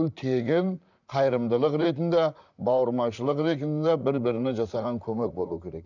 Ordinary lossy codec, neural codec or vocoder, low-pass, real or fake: none; vocoder, 44.1 kHz, 128 mel bands, Pupu-Vocoder; 7.2 kHz; fake